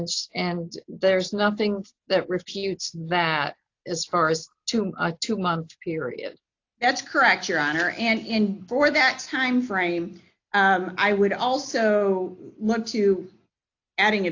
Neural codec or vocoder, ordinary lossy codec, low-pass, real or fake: none; AAC, 48 kbps; 7.2 kHz; real